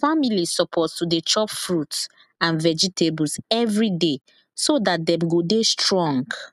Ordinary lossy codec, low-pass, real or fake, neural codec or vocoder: none; 14.4 kHz; real; none